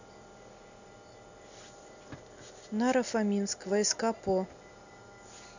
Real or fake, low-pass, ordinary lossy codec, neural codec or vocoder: real; 7.2 kHz; none; none